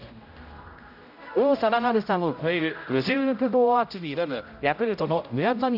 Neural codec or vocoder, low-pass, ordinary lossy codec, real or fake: codec, 16 kHz, 0.5 kbps, X-Codec, HuBERT features, trained on general audio; 5.4 kHz; none; fake